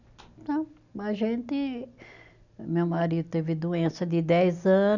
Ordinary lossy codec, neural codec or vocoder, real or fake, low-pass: none; none; real; 7.2 kHz